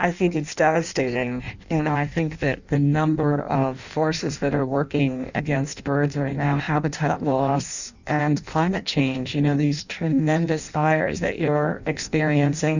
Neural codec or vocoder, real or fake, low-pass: codec, 16 kHz in and 24 kHz out, 0.6 kbps, FireRedTTS-2 codec; fake; 7.2 kHz